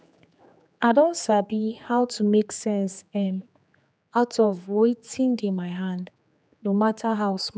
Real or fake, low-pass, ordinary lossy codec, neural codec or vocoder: fake; none; none; codec, 16 kHz, 4 kbps, X-Codec, HuBERT features, trained on general audio